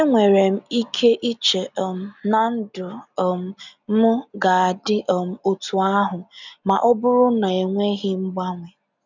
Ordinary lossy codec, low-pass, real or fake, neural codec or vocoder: none; 7.2 kHz; real; none